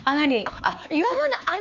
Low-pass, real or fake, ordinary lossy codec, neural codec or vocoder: 7.2 kHz; fake; none; codec, 16 kHz, 4 kbps, X-Codec, HuBERT features, trained on LibriSpeech